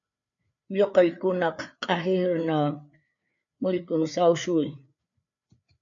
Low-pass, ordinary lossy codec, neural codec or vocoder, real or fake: 7.2 kHz; MP3, 64 kbps; codec, 16 kHz, 4 kbps, FreqCodec, larger model; fake